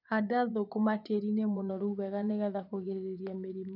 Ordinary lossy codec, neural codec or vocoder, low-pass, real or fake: none; vocoder, 24 kHz, 100 mel bands, Vocos; 5.4 kHz; fake